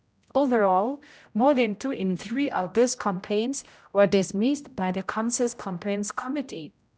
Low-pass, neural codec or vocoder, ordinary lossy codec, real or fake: none; codec, 16 kHz, 0.5 kbps, X-Codec, HuBERT features, trained on general audio; none; fake